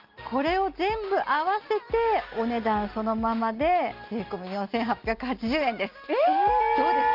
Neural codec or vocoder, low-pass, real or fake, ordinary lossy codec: none; 5.4 kHz; real; Opus, 24 kbps